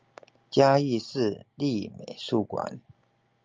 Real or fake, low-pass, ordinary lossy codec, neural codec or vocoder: real; 7.2 kHz; Opus, 24 kbps; none